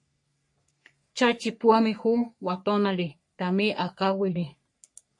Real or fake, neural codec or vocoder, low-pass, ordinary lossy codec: fake; codec, 44.1 kHz, 3.4 kbps, Pupu-Codec; 10.8 kHz; MP3, 48 kbps